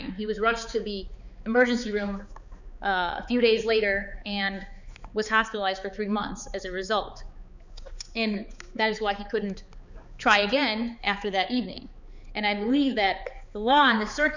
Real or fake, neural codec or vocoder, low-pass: fake; codec, 16 kHz, 4 kbps, X-Codec, HuBERT features, trained on balanced general audio; 7.2 kHz